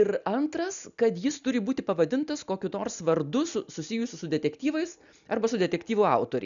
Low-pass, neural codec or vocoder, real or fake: 7.2 kHz; none; real